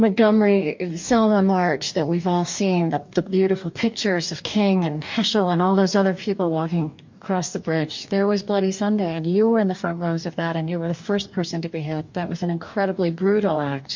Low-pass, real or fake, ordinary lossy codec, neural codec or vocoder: 7.2 kHz; fake; MP3, 48 kbps; codec, 44.1 kHz, 2.6 kbps, DAC